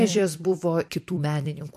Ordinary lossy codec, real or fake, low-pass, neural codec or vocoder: MP3, 64 kbps; fake; 14.4 kHz; vocoder, 44.1 kHz, 128 mel bands every 256 samples, BigVGAN v2